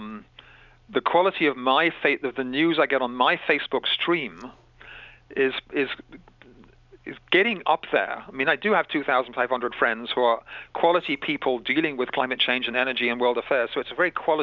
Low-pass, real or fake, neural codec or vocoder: 7.2 kHz; real; none